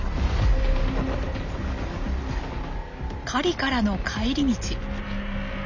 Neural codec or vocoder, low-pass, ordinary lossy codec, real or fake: none; 7.2 kHz; Opus, 64 kbps; real